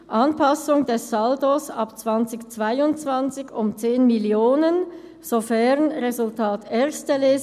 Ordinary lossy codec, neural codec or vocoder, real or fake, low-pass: none; none; real; 14.4 kHz